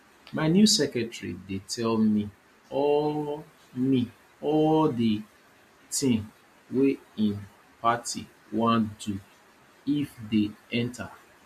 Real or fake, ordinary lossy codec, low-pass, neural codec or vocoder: real; MP3, 64 kbps; 14.4 kHz; none